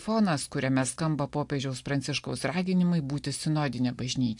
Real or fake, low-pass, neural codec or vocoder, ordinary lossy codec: real; 10.8 kHz; none; AAC, 64 kbps